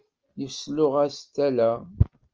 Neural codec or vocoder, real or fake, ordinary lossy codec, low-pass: none; real; Opus, 24 kbps; 7.2 kHz